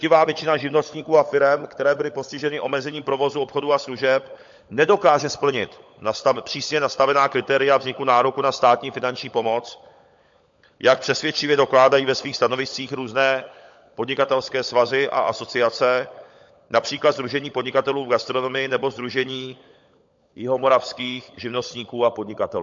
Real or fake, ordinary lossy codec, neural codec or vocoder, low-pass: fake; MP3, 48 kbps; codec, 16 kHz, 16 kbps, FunCodec, trained on LibriTTS, 50 frames a second; 7.2 kHz